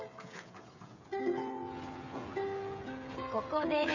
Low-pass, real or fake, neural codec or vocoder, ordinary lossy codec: 7.2 kHz; fake; codec, 16 kHz, 16 kbps, FreqCodec, smaller model; none